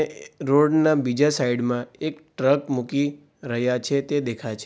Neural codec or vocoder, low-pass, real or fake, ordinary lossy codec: none; none; real; none